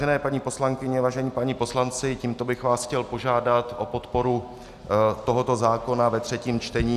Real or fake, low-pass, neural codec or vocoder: real; 14.4 kHz; none